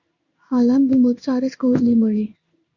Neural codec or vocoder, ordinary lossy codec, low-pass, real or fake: codec, 16 kHz in and 24 kHz out, 1 kbps, XY-Tokenizer; Opus, 64 kbps; 7.2 kHz; fake